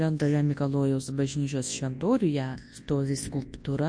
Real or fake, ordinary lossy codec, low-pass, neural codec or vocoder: fake; MP3, 48 kbps; 9.9 kHz; codec, 24 kHz, 0.9 kbps, WavTokenizer, large speech release